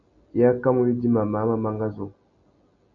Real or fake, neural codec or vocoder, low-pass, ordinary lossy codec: real; none; 7.2 kHz; AAC, 64 kbps